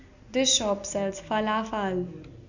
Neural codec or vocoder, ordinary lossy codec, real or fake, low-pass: none; none; real; 7.2 kHz